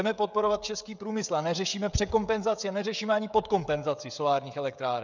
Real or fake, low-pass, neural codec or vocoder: fake; 7.2 kHz; codec, 16 kHz, 16 kbps, FreqCodec, smaller model